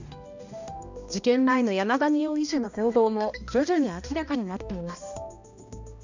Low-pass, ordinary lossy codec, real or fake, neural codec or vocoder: 7.2 kHz; none; fake; codec, 16 kHz, 1 kbps, X-Codec, HuBERT features, trained on balanced general audio